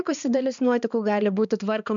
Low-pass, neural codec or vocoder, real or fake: 7.2 kHz; codec, 16 kHz, 2 kbps, X-Codec, HuBERT features, trained on balanced general audio; fake